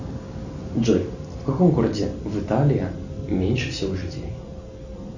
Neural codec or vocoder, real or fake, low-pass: none; real; 7.2 kHz